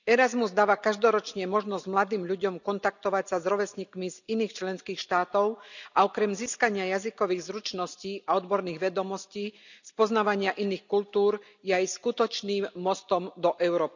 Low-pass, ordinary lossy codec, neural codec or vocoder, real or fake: 7.2 kHz; none; none; real